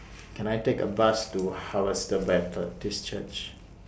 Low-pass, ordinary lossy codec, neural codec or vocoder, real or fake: none; none; none; real